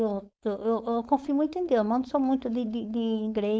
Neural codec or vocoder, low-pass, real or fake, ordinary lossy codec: codec, 16 kHz, 4.8 kbps, FACodec; none; fake; none